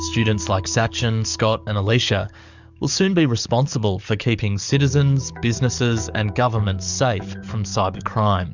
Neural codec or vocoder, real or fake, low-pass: codec, 44.1 kHz, 7.8 kbps, DAC; fake; 7.2 kHz